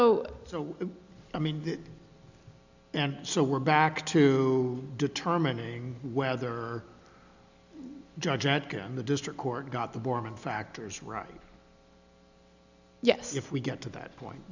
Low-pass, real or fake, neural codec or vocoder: 7.2 kHz; real; none